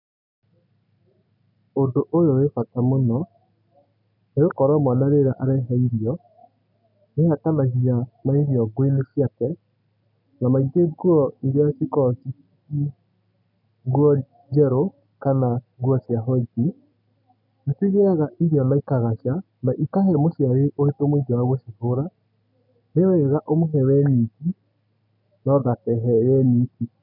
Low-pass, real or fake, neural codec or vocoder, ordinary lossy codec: 5.4 kHz; real; none; none